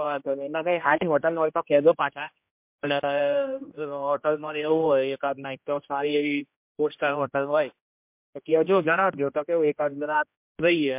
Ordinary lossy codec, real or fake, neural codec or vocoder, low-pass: MP3, 32 kbps; fake; codec, 16 kHz, 1 kbps, X-Codec, HuBERT features, trained on general audio; 3.6 kHz